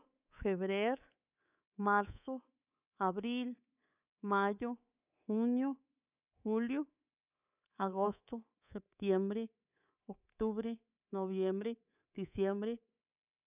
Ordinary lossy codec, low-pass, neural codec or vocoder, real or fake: none; 3.6 kHz; codec, 24 kHz, 3.1 kbps, DualCodec; fake